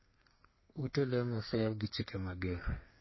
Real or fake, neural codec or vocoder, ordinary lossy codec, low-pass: fake; codec, 32 kHz, 1.9 kbps, SNAC; MP3, 24 kbps; 7.2 kHz